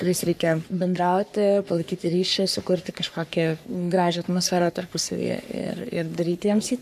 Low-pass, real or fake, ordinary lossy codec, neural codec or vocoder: 14.4 kHz; fake; AAC, 96 kbps; codec, 44.1 kHz, 3.4 kbps, Pupu-Codec